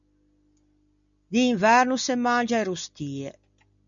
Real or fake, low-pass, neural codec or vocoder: real; 7.2 kHz; none